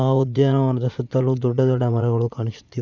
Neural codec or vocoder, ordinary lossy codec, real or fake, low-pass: vocoder, 44.1 kHz, 80 mel bands, Vocos; none; fake; 7.2 kHz